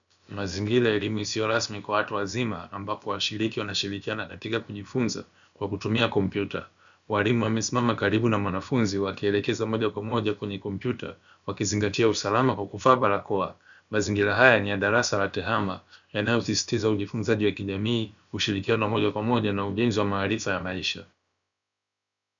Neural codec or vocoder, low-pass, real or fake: codec, 16 kHz, about 1 kbps, DyCAST, with the encoder's durations; 7.2 kHz; fake